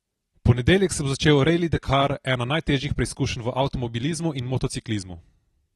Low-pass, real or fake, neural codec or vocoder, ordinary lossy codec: 19.8 kHz; real; none; AAC, 32 kbps